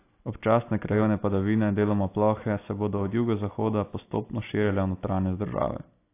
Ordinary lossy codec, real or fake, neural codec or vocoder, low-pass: AAC, 24 kbps; real; none; 3.6 kHz